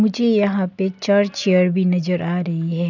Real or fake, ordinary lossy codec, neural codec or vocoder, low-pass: real; none; none; 7.2 kHz